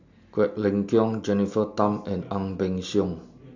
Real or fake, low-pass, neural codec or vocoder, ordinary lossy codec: real; 7.2 kHz; none; AAC, 48 kbps